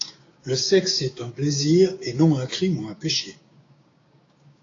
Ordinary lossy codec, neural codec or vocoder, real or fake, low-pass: AAC, 32 kbps; codec, 16 kHz, 6 kbps, DAC; fake; 7.2 kHz